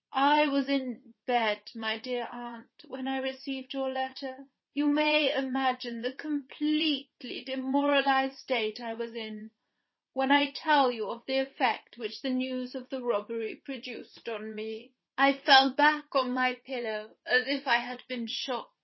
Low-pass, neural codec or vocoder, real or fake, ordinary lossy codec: 7.2 kHz; vocoder, 22.05 kHz, 80 mel bands, Vocos; fake; MP3, 24 kbps